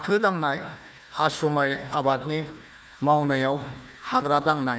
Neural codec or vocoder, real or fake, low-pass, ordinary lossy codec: codec, 16 kHz, 1 kbps, FunCodec, trained on Chinese and English, 50 frames a second; fake; none; none